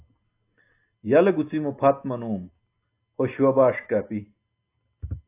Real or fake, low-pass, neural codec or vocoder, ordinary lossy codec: real; 3.6 kHz; none; AAC, 24 kbps